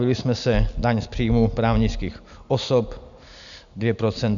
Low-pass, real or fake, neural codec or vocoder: 7.2 kHz; real; none